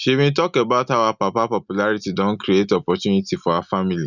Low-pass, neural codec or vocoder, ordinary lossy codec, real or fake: 7.2 kHz; none; none; real